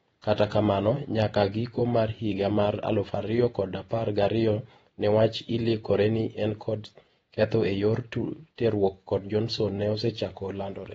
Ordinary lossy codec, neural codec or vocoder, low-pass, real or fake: AAC, 24 kbps; vocoder, 48 kHz, 128 mel bands, Vocos; 19.8 kHz; fake